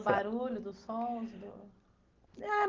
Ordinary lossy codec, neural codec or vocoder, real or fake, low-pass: Opus, 16 kbps; none; real; 7.2 kHz